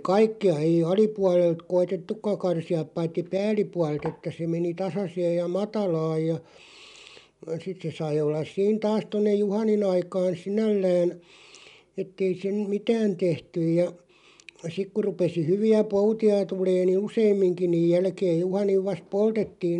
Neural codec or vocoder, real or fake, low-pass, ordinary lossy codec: none; real; 10.8 kHz; none